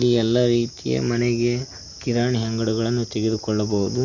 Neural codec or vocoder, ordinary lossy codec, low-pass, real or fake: none; none; 7.2 kHz; real